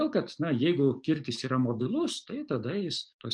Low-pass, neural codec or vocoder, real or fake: 9.9 kHz; codec, 44.1 kHz, 7.8 kbps, DAC; fake